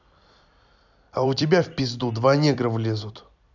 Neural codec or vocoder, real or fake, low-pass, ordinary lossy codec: vocoder, 44.1 kHz, 128 mel bands every 256 samples, BigVGAN v2; fake; 7.2 kHz; none